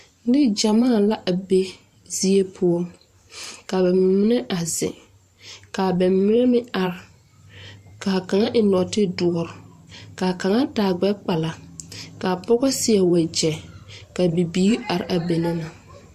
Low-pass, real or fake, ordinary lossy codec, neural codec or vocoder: 14.4 kHz; fake; MP3, 64 kbps; vocoder, 44.1 kHz, 128 mel bands every 512 samples, BigVGAN v2